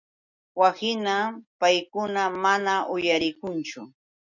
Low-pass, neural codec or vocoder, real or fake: 7.2 kHz; none; real